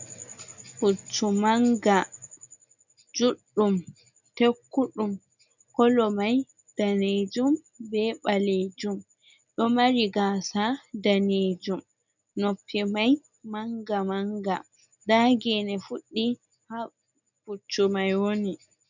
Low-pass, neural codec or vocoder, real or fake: 7.2 kHz; none; real